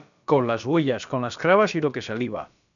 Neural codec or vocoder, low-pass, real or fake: codec, 16 kHz, about 1 kbps, DyCAST, with the encoder's durations; 7.2 kHz; fake